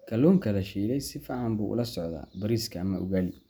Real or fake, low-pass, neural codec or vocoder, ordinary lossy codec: real; none; none; none